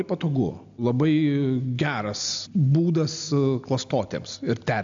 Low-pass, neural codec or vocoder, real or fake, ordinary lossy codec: 7.2 kHz; none; real; AAC, 64 kbps